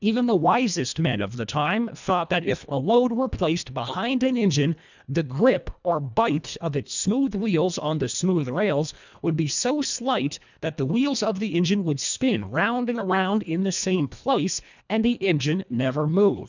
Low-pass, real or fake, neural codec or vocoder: 7.2 kHz; fake; codec, 24 kHz, 1.5 kbps, HILCodec